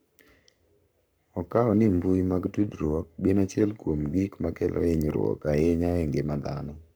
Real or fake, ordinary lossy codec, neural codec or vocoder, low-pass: fake; none; codec, 44.1 kHz, 7.8 kbps, Pupu-Codec; none